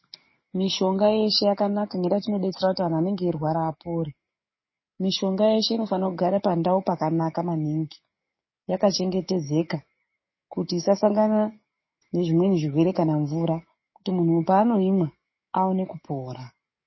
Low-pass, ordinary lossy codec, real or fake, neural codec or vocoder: 7.2 kHz; MP3, 24 kbps; fake; codec, 44.1 kHz, 7.8 kbps, DAC